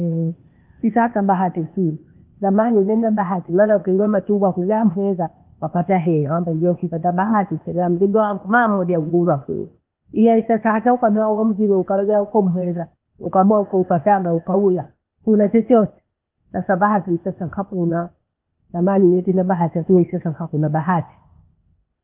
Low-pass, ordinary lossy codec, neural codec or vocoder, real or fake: 3.6 kHz; Opus, 32 kbps; codec, 16 kHz, 0.8 kbps, ZipCodec; fake